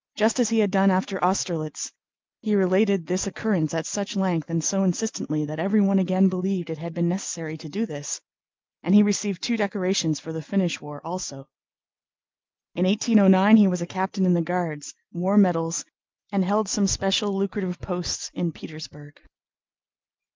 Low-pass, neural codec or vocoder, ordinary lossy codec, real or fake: 7.2 kHz; none; Opus, 32 kbps; real